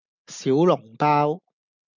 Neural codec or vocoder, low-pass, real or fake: none; 7.2 kHz; real